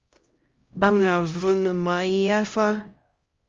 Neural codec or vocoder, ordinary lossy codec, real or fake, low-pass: codec, 16 kHz, 0.5 kbps, X-Codec, HuBERT features, trained on LibriSpeech; Opus, 32 kbps; fake; 7.2 kHz